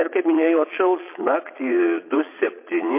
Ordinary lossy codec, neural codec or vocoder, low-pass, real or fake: MP3, 24 kbps; vocoder, 22.05 kHz, 80 mel bands, Vocos; 3.6 kHz; fake